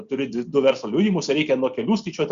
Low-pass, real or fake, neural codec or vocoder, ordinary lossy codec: 7.2 kHz; real; none; Opus, 64 kbps